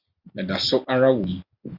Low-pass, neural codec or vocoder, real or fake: 5.4 kHz; none; real